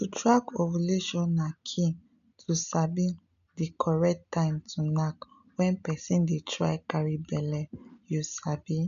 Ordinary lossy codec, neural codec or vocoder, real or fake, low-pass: none; none; real; 7.2 kHz